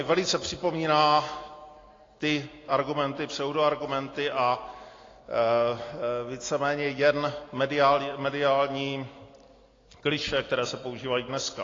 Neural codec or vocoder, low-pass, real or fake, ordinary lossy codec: none; 7.2 kHz; real; AAC, 32 kbps